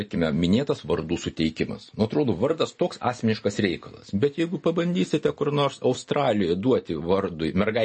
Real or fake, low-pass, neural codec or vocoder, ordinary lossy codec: real; 10.8 kHz; none; MP3, 32 kbps